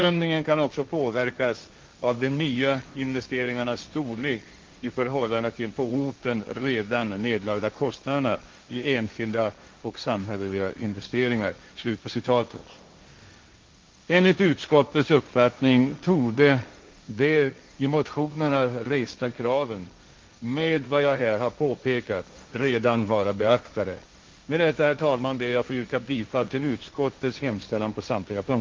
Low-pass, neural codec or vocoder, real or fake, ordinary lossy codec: 7.2 kHz; codec, 16 kHz, 1.1 kbps, Voila-Tokenizer; fake; Opus, 24 kbps